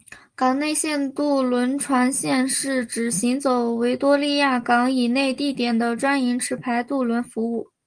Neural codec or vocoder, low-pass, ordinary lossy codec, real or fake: none; 9.9 kHz; Opus, 24 kbps; real